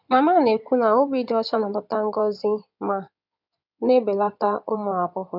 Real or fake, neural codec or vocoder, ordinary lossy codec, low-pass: fake; codec, 16 kHz in and 24 kHz out, 2.2 kbps, FireRedTTS-2 codec; none; 5.4 kHz